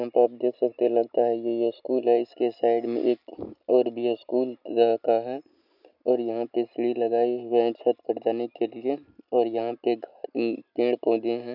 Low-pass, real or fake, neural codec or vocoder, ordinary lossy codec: 5.4 kHz; real; none; none